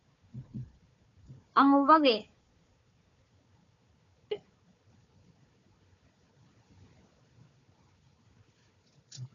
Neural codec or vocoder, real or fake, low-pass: codec, 16 kHz, 4 kbps, FunCodec, trained on Chinese and English, 50 frames a second; fake; 7.2 kHz